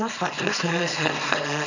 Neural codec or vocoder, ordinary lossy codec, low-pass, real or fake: codec, 24 kHz, 0.9 kbps, WavTokenizer, small release; none; 7.2 kHz; fake